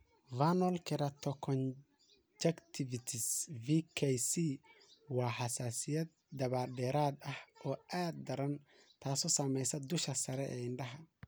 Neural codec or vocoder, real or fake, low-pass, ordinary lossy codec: none; real; none; none